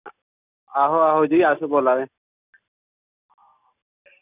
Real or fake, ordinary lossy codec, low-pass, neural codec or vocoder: real; none; 3.6 kHz; none